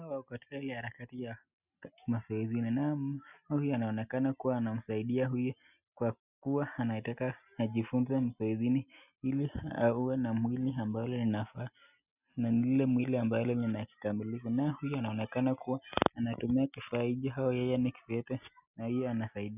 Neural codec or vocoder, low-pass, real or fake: none; 3.6 kHz; real